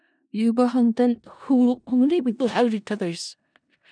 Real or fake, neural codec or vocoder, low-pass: fake; codec, 16 kHz in and 24 kHz out, 0.4 kbps, LongCat-Audio-Codec, four codebook decoder; 9.9 kHz